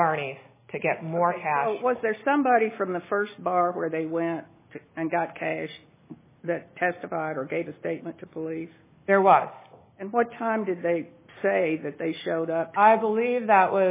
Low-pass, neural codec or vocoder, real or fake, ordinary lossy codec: 3.6 kHz; none; real; MP3, 16 kbps